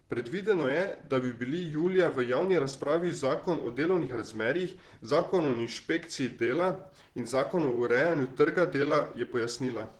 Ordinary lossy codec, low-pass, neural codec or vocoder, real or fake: Opus, 16 kbps; 19.8 kHz; vocoder, 44.1 kHz, 128 mel bands, Pupu-Vocoder; fake